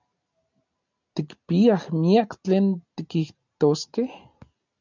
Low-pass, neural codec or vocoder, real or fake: 7.2 kHz; none; real